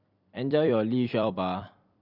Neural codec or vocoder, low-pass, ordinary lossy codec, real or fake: vocoder, 44.1 kHz, 128 mel bands every 256 samples, BigVGAN v2; 5.4 kHz; none; fake